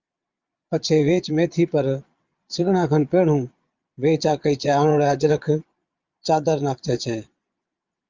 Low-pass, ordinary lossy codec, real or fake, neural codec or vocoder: 7.2 kHz; Opus, 24 kbps; fake; vocoder, 22.05 kHz, 80 mel bands, Vocos